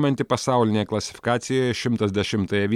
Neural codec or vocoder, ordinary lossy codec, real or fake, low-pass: none; MP3, 96 kbps; real; 19.8 kHz